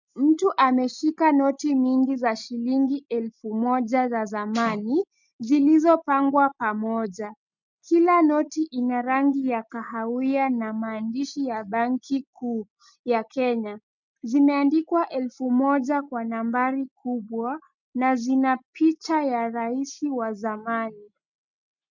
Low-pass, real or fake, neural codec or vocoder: 7.2 kHz; real; none